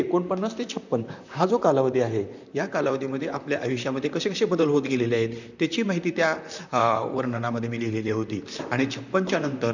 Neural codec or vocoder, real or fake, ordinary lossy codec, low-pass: vocoder, 44.1 kHz, 128 mel bands, Pupu-Vocoder; fake; none; 7.2 kHz